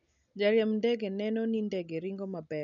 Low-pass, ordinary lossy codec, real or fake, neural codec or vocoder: 7.2 kHz; none; real; none